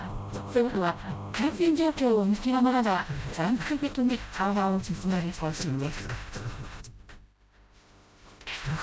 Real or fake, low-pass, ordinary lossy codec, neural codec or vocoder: fake; none; none; codec, 16 kHz, 0.5 kbps, FreqCodec, smaller model